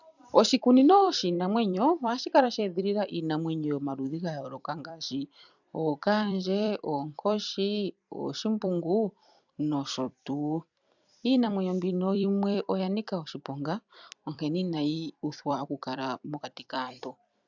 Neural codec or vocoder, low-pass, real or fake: vocoder, 44.1 kHz, 128 mel bands every 256 samples, BigVGAN v2; 7.2 kHz; fake